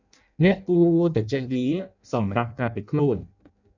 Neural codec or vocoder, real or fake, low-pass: codec, 16 kHz in and 24 kHz out, 0.6 kbps, FireRedTTS-2 codec; fake; 7.2 kHz